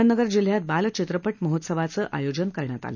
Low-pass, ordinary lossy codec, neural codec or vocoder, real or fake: 7.2 kHz; none; none; real